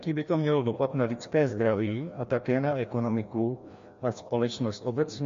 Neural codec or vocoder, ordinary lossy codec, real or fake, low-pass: codec, 16 kHz, 1 kbps, FreqCodec, larger model; MP3, 48 kbps; fake; 7.2 kHz